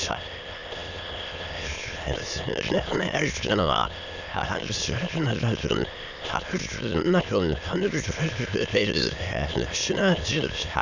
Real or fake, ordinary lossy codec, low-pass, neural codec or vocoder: fake; none; 7.2 kHz; autoencoder, 22.05 kHz, a latent of 192 numbers a frame, VITS, trained on many speakers